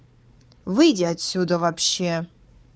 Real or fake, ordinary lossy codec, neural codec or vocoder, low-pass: fake; none; codec, 16 kHz, 4 kbps, FunCodec, trained on Chinese and English, 50 frames a second; none